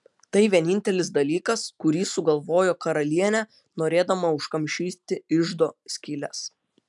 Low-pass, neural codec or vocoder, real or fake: 10.8 kHz; none; real